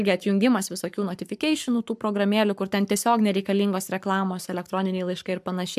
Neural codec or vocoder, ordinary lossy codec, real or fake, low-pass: codec, 44.1 kHz, 7.8 kbps, Pupu-Codec; AAC, 96 kbps; fake; 14.4 kHz